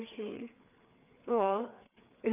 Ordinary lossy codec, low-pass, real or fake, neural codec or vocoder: none; 3.6 kHz; fake; codec, 16 kHz, 4 kbps, FreqCodec, larger model